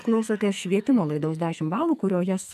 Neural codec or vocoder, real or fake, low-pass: codec, 44.1 kHz, 2.6 kbps, SNAC; fake; 14.4 kHz